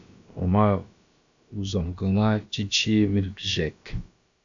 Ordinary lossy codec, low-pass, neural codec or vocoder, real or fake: AAC, 64 kbps; 7.2 kHz; codec, 16 kHz, about 1 kbps, DyCAST, with the encoder's durations; fake